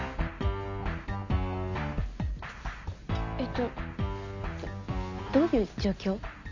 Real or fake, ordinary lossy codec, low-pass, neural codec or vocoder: real; none; 7.2 kHz; none